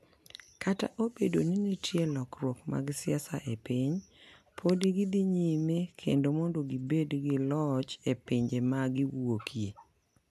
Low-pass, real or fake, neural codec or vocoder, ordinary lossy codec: 14.4 kHz; real; none; none